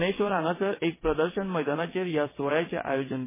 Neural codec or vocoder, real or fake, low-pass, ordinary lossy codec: vocoder, 22.05 kHz, 80 mel bands, WaveNeXt; fake; 3.6 kHz; MP3, 16 kbps